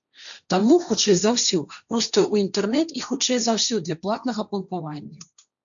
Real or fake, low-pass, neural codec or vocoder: fake; 7.2 kHz; codec, 16 kHz, 1.1 kbps, Voila-Tokenizer